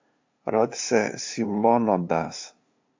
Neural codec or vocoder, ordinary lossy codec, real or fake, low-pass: codec, 16 kHz, 2 kbps, FunCodec, trained on LibriTTS, 25 frames a second; MP3, 48 kbps; fake; 7.2 kHz